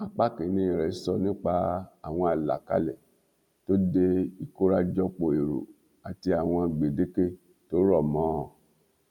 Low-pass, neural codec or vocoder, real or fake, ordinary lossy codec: 19.8 kHz; none; real; none